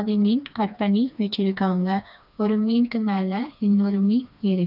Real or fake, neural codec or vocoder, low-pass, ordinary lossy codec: fake; codec, 16 kHz, 2 kbps, FreqCodec, smaller model; 5.4 kHz; none